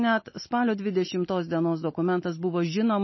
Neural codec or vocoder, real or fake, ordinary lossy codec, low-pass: none; real; MP3, 24 kbps; 7.2 kHz